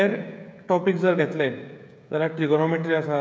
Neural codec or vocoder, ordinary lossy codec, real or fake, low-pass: codec, 16 kHz, 16 kbps, FreqCodec, smaller model; none; fake; none